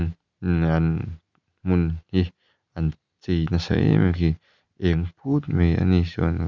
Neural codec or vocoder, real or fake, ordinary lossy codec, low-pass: none; real; none; 7.2 kHz